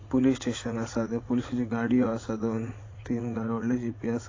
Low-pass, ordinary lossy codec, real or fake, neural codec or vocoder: 7.2 kHz; AAC, 32 kbps; fake; vocoder, 44.1 kHz, 80 mel bands, Vocos